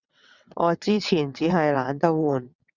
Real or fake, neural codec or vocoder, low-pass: fake; vocoder, 22.05 kHz, 80 mel bands, WaveNeXt; 7.2 kHz